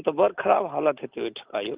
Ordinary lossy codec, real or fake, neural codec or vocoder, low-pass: Opus, 24 kbps; real; none; 3.6 kHz